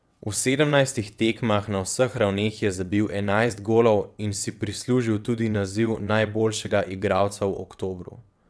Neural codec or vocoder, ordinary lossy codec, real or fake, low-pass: vocoder, 22.05 kHz, 80 mel bands, WaveNeXt; none; fake; none